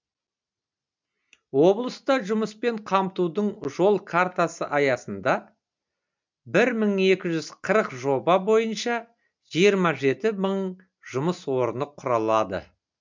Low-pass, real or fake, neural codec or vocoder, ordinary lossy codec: 7.2 kHz; real; none; MP3, 64 kbps